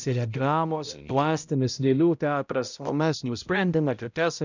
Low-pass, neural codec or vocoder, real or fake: 7.2 kHz; codec, 16 kHz, 0.5 kbps, X-Codec, HuBERT features, trained on balanced general audio; fake